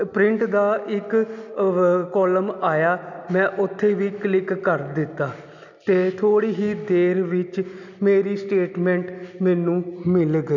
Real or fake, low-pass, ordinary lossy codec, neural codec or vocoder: real; 7.2 kHz; none; none